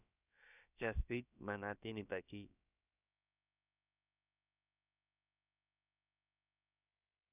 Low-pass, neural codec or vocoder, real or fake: 3.6 kHz; codec, 16 kHz, about 1 kbps, DyCAST, with the encoder's durations; fake